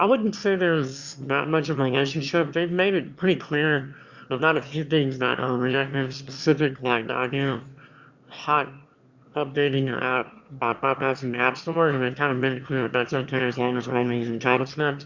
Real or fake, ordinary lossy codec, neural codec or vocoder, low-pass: fake; Opus, 64 kbps; autoencoder, 22.05 kHz, a latent of 192 numbers a frame, VITS, trained on one speaker; 7.2 kHz